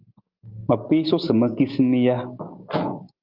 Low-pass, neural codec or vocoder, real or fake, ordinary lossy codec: 5.4 kHz; none; real; Opus, 32 kbps